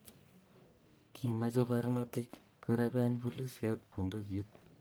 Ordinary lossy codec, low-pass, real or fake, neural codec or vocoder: none; none; fake; codec, 44.1 kHz, 1.7 kbps, Pupu-Codec